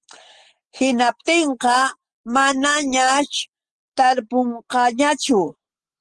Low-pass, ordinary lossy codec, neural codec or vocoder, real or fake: 9.9 kHz; Opus, 16 kbps; none; real